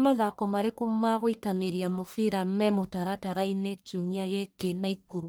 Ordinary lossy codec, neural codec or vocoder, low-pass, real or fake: none; codec, 44.1 kHz, 1.7 kbps, Pupu-Codec; none; fake